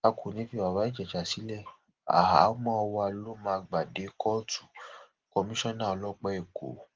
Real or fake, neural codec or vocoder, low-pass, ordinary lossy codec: real; none; 7.2 kHz; Opus, 16 kbps